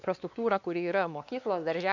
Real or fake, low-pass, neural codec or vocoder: fake; 7.2 kHz; codec, 16 kHz, 2 kbps, X-Codec, WavLM features, trained on Multilingual LibriSpeech